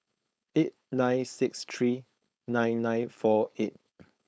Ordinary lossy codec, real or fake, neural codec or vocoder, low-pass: none; fake; codec, 16 kHz, 4.8 kbps, FACodec; none